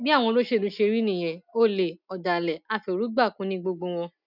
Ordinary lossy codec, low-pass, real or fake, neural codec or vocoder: none; 5.4 kHz; real; none